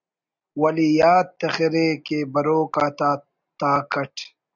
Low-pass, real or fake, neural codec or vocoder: 7.2 kHz; real; none